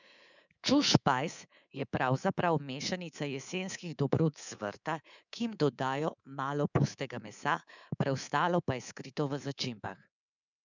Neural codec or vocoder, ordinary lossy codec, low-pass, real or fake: codec, 24 kHz, 3.1 kbps, DualCodec; none; 7.2 kHz; fake